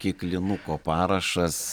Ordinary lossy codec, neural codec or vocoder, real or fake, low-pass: Opus, 64 kbps; none; real; 19.8 kHz